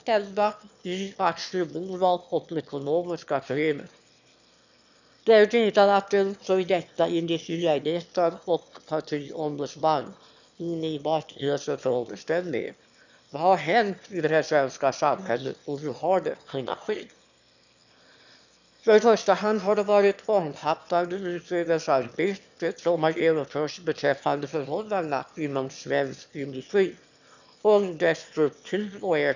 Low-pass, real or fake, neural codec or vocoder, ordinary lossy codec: 7.2 kHz; fake; autoencoder, 22.05 kHz, a latent of 192 numbers a frame, VITS, trained on one speaker; none